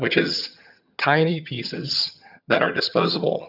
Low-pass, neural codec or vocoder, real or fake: 5.4 kHz; vocoder, 22.05 kHz, 80 mel bands, HiFi-GAN; fake